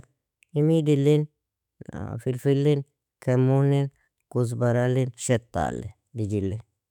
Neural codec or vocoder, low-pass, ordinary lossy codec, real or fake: autoencoder, 48 kHz, 128 numbers a frame, DAC-VAE, trained on Japanese speech; 19.8 kHz; none; fake